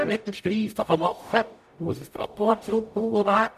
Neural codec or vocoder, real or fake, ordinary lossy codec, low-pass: codec, 44.1 kHz, 0.9 kbps, DAC; fake; none; 14.4 kHz